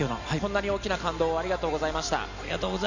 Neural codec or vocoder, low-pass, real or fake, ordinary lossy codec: none; 7.2 kHz; real; none